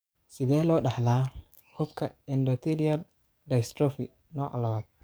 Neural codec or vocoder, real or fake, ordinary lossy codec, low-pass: codec, 44.1 kHz, 7.8 kbps, DAC; fake; none; none